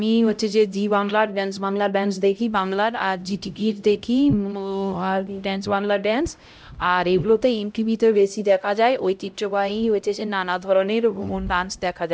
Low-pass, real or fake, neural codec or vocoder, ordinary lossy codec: none; fake; codec, 16 kHz, 0.5 kbps, X-Codec, HuBERT features, trained on LibriSpeech; none